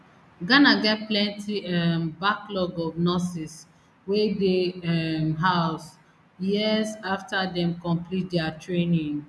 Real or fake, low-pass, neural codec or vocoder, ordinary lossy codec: real; none; none; none